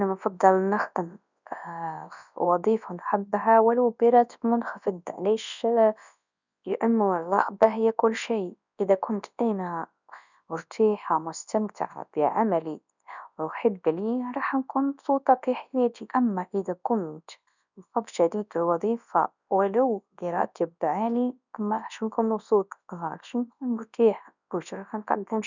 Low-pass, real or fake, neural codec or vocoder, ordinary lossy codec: 7.2 kHz; fake; codec, 24 kHz, 0.9 kbps, WavTokenizer, large speech release; none